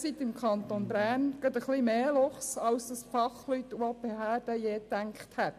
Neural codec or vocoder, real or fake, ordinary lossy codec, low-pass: none; real; AAC, 96 kbps; 14.4 kHz